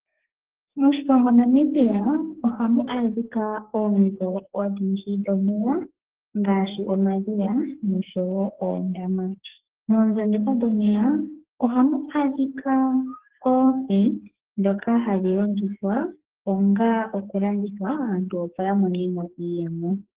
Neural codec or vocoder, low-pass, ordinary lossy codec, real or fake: codec, 44.1 kHz, 2.6 kbps, SNAC; 3.6 kHz; Opus, 16 kbps; fake